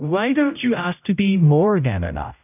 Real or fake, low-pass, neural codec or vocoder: fake; 3.6 kHz; codec, 16 kHz, 0.5 kbps, X-Codec, HuBERT features, trained on general audio